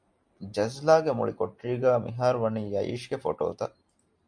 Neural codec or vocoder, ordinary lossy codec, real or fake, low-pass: none; MP3, 64 kbps; real; 9.9 kHz